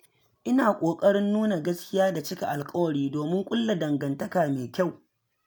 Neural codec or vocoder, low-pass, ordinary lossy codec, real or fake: none; none; none; real